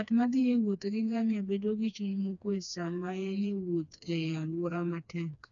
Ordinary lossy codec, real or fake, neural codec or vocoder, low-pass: none; fake; codec, 16 kHz, 2 kbps, FreqCodec, smaller model; 7.2 kHz